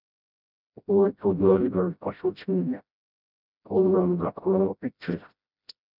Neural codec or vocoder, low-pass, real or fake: codec, 16 kHz, 0.5 kbps, FreqCodec, smaller model; 5.4 kHz; fake